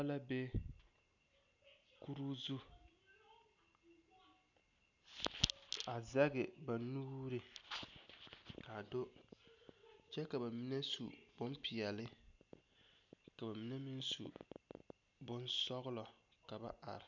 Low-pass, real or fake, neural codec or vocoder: 7.2 kHz; real; none